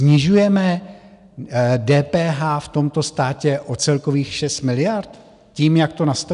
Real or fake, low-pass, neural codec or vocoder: real; 10.8 kHz; none